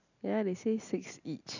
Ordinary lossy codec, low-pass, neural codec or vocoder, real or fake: none; 7.2 kHz; none; real